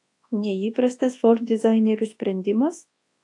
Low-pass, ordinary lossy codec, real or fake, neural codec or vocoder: 10.8 kHz; AAC, 48 kbps; fake; codec, 24 kHz, 0.9 kbps, WavTokenizer, large speech release